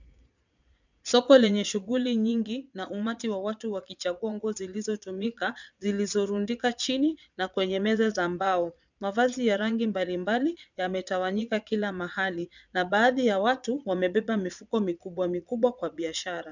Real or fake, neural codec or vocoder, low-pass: fake; vocoder, 22.05 kHz, 80 mel bands, Vocos; 7.2 kHz